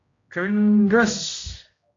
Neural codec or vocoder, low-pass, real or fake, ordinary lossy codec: codec, 16 kHz, 0.5 kbps, X-Codec, HuBERT features, trained on general audio; 7.2 kHz; fake; AAC, 48 kbps